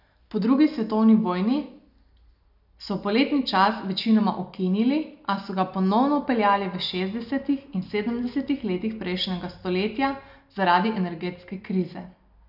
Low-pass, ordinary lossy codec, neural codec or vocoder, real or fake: 5.4 kHz; none; none; real